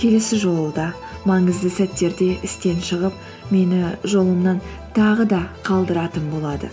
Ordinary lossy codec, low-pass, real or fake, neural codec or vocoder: none; none; real; none